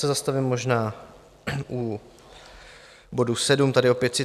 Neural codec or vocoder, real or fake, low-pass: none; real; 14.4 kHz